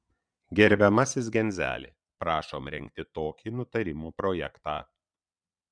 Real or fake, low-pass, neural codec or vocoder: fake; 9.9 kHz; vocoder, 22.05 kHz, 80 mel bands, Vocos